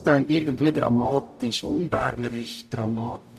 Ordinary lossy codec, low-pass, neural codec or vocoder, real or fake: none; 14.4 kHz; codec, 44.1 kHz, 0.9 kbps, DAC; fake